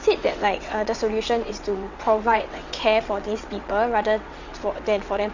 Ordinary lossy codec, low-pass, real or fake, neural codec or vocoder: Opus, 64 kbps; 7.2 kHz; fake; vocoder, 22.05 kHz, 80 mel bands, WaveNeXt